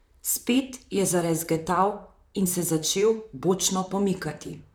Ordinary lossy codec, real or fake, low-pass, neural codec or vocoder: none; fake; none; vocoder, 44.1 kHz, 128 mel bands, Pupu-Vocoder